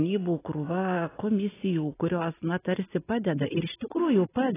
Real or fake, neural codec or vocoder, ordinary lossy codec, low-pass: real; none; AAC, 16 kbps; 3.6 kHz